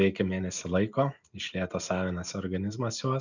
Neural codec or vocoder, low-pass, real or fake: none; 7.2 kHz; real